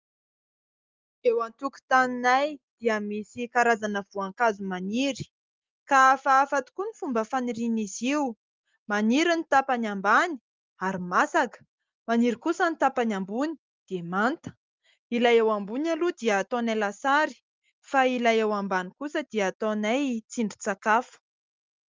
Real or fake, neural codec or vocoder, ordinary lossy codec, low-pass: real; none; Opus, 32 kbps; 7.2 kHz